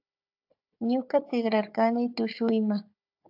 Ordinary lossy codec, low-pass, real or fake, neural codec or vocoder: AAC, 48 kbps; 5.4 kHz; fake; codec, 16 kHz, 4 kbps, FunCodec, trained on Chinese and English, 50 frames a second